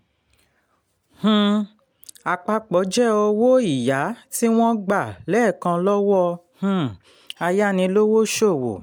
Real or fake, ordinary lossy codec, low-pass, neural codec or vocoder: real; MP3, 96 kbps; 19.8 kHz; none